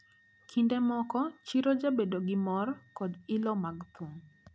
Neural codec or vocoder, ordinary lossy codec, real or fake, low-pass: none; none; real; none